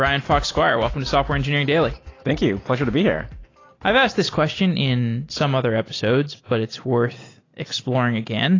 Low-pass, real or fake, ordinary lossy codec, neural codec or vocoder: 7.2 kHz; real; AAC, 32 kbps; none